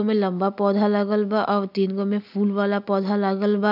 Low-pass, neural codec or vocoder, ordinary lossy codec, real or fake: 5.4 kHz; none; none; real